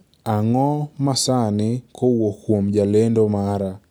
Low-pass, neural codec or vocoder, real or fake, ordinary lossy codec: none; none; real; none